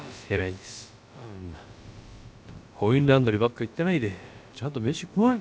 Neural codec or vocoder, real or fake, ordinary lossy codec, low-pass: codec, 16 kHz, about 1 kbps, DyCAST, with the encoder's durations; fake; none; none